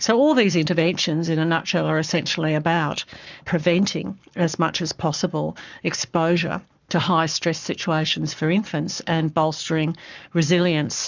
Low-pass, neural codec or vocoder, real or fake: 7.2 kHz; codec, 44.1 kHz, 7.8 kbps, Pupu-Codec; fake